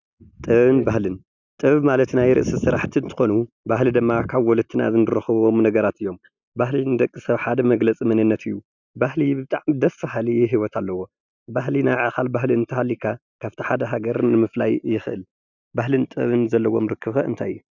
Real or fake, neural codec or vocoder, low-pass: real; none; 7.2 kHz